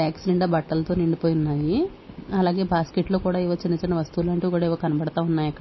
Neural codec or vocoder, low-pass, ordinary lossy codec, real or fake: none; 7.2 kHz; MP3, 24 kbps; real